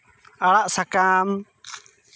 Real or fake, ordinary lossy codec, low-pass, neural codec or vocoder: real; none; none; none